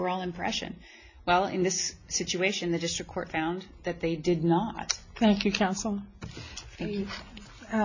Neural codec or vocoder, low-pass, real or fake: none; 7.2 kHz; real